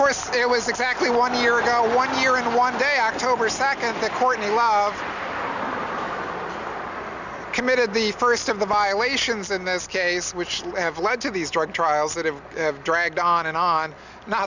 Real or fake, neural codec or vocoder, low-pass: real; none; 7.2 kHz